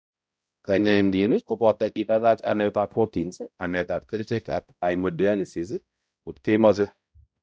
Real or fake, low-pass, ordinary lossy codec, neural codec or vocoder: fake; none; none; codec, 16 kHz, 0.5 kbps, X-Codec, HuBERT features, trained on balanced general audio